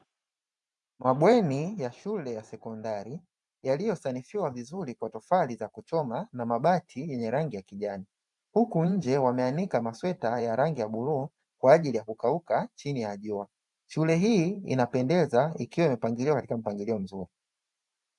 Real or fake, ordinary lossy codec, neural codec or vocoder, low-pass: fake; MP3, 96 kbps; vocoder, 44.1 kHz, 128 mel bands every 512 samples, BigVGAN v2; 10.8 kHz